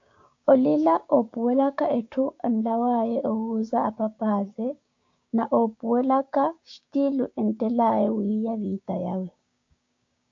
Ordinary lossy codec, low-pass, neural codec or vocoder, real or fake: AAC, 48 kbps; 7.2 kHz; codec, 16 kHz, 6 kbps, DAC; fake